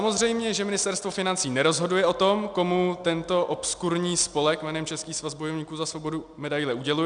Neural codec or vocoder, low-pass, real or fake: none; 9.9 kHz; real